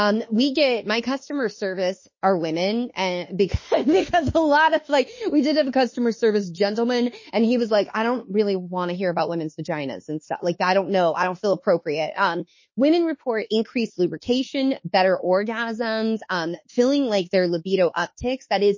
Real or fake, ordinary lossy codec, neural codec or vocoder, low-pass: fake; MP3, 32 kbps; autoencoder, 48 kHz, 32 numbers a frame, DAC-VAE, trained on Japanese speech; 7.2 kHz